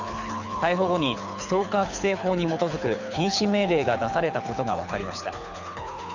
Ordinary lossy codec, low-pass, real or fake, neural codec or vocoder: none; 7.2 kHz; fake; codec, 24 kHz, 6 kbps, HILCodec